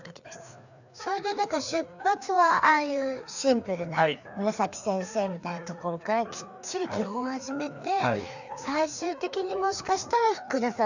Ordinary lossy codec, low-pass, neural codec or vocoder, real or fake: none; 7.2 kHz; codec, 16 kHz, 2 kbps, FreqCodec, larger model; fake